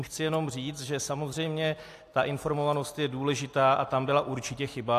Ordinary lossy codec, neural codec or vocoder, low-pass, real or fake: AAC, 64 kbps; none; 14.4 kHz; real